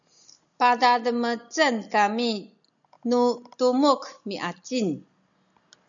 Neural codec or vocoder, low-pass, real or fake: none; 7.2 kHz; real